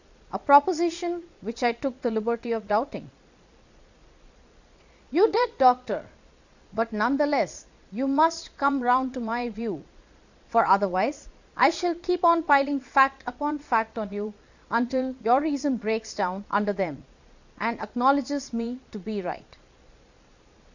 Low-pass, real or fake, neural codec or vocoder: 7.2 kHz; fake; vocoder, 22.05 kHz, 80 mel bands, Vocos